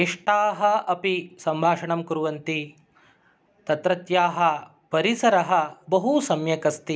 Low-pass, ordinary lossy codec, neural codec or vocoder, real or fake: none; none; none; real